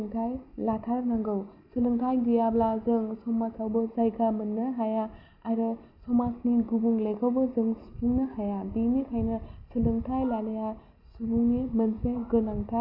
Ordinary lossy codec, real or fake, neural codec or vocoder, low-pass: AAC, 48 kbps; real; none; 5.4 kHz